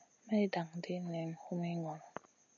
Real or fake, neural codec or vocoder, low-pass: real; none; 7.2 kHz